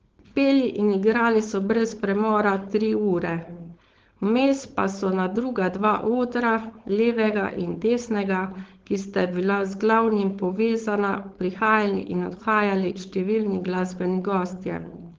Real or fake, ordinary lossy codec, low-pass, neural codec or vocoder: fake; Opus, 16 kbps; 7.2 kHz; codec, 16 kHz, 4.8 kbps, FACodec